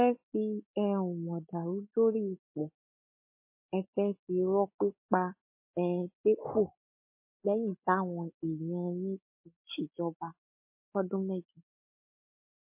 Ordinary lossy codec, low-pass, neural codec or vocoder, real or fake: MP3, 24 kbps; 3.6 kHz; none; real